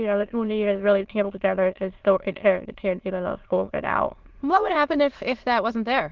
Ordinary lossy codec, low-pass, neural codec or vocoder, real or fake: Opus, 16 kbps; 7.2 kHz; autoencoder, 22.05 kHz, a latent of 192 numbers a frame, VITS, trained on many speakers; fake